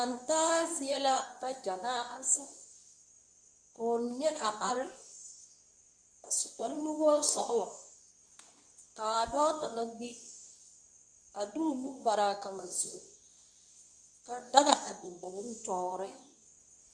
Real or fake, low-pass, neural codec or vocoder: fake; 9.9 kHz; codec, 24 kHz, 0.9 kbps, WavTokenizer, medium speech release version 2